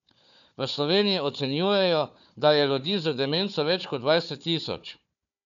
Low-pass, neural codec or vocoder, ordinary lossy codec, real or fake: 7.2 kHz; codec, 16 kHz, 4 kbps, FunCodec, trained on Chinese and English, 50 frames a second; none; fake